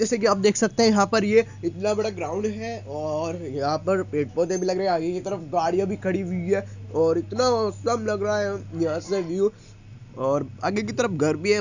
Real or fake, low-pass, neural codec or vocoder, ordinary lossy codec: real; 7.2 kHz; none; none